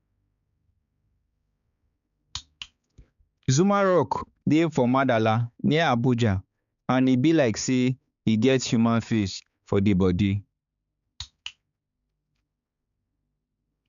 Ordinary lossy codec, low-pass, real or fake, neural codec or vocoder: none; 7.2 kHz; fake; codec, 16 kHz, 4 kbps, X-Codec, HuBERT features, trained on balanced general audio